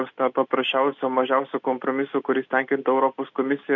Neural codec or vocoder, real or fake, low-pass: none; real; 7.2 kHz